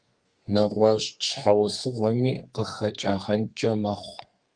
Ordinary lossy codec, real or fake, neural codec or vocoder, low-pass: Opus, 32 kbps; fake; codec, 32 kHz, 1.9 kbps, SNAC; 9.9 kHz